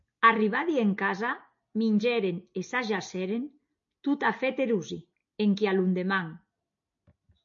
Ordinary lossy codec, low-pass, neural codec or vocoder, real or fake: MP3, 48 kbps; 7.2 kHz; none; real